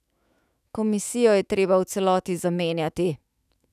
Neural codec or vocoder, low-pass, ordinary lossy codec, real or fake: autoencoder, 48 kHz, 128 numbers a frame, DAC-VAE, trained on Japanese speech; 14.4 kHz; none; fake